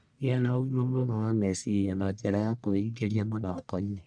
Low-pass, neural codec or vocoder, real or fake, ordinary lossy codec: 9.9 kHz; codec, 44.1 kHz, 1.7 kbps, Pupu-Codec; fake; none